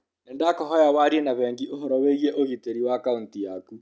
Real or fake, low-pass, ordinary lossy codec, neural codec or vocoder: real; none; none; none